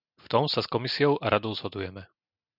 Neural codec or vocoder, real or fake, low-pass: none; real; 5.4 kHz